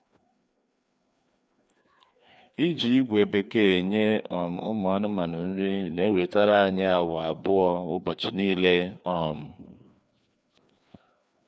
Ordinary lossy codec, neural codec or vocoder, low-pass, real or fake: none; codec, 16 kHz, 2 kbps, FreqCodec, larger model; none; fake